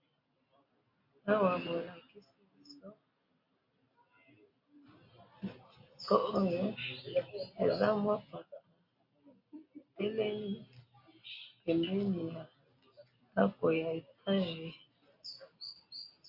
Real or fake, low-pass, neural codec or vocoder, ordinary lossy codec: real; 5.4 kHz; none; MP3, 32 kbps